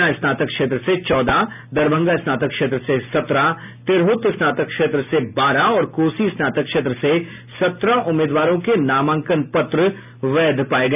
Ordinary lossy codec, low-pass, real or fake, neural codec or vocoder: none; 3.6 kHz; real; none